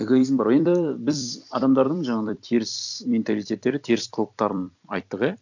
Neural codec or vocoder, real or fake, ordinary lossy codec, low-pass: vocoder, 44.1 kHz, 128 mel bands every 256 samples, BigVGAN v2; fake; none; 7.2 kHz